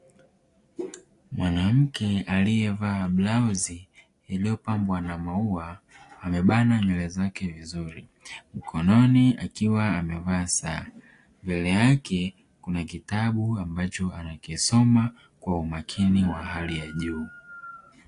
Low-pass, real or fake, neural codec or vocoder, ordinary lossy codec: 10.8 kHz; real; none; AAC, 48 kbps